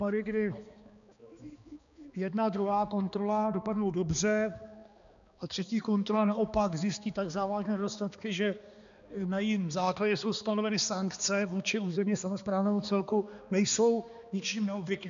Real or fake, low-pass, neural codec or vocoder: fake; 7.2 kHz; codec, 16 kHz, 2 kbps, X-Codec, HuBERT features, trained on balanced general audio